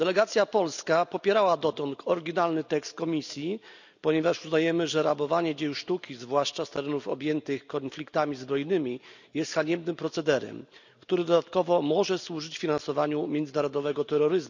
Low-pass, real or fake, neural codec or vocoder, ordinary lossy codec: 7.2 kHz; real; none; none